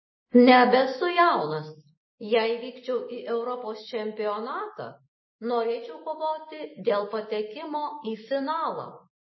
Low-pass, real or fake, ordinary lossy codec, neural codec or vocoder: 7.2 kHz; real; MP3, 24 kbps; none